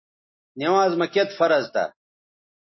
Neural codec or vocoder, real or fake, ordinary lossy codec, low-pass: none; real; MP3, 24 kbps; 7.2 kHz